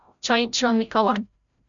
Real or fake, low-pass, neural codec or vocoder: fake; 7.2 kHz; codec, 16 kHz, 0.5 kbps, FreqCodec, larger model